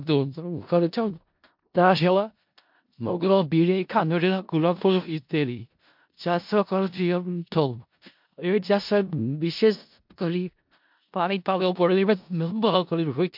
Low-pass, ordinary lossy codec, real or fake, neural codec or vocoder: 5.4 kHz; MP3, 48 kbps; fake; codec, 16 kHz in and 24 kHz out, 0.4 kbps, LongCat-Audio-Codec, four codebook decoder